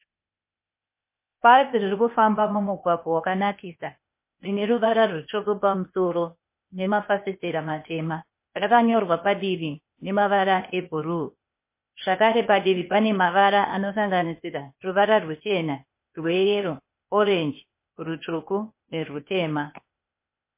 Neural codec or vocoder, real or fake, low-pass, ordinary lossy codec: codec, 16 kHz, 0.8 kbps, ZipCodec; fake; 3.6 kHz; MP3, 24 kbps